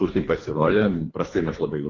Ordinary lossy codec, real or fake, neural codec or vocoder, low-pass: AAC, 32 kbps; fake; codec, 24 kHz, 3 kbps, HILCodec; 7.2 kHz